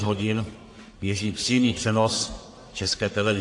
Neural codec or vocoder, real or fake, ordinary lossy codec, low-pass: codec, 44.1 kHz, 1.7 kbps, Pupu-Codec; fake; AAC, 48 kbps; 10.8 kHz